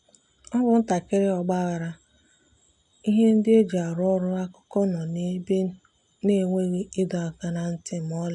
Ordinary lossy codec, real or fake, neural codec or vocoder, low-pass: none; real; none; 10.8 kHz